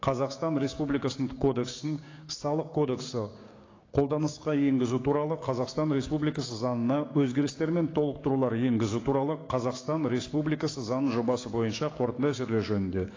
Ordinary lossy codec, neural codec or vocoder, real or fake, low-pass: AAC, 32 kbps; codec, 16 kHz, 6 kbps, DAC; fake; 7.2 kHz